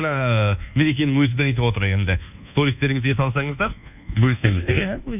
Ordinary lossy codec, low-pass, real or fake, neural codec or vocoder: none; 3.6 kHz; fake; codec, 24 kHz, 1.2 kbps, DualCodec